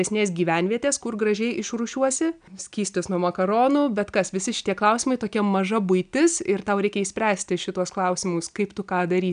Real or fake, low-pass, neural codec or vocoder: real; 9.9 kHz; none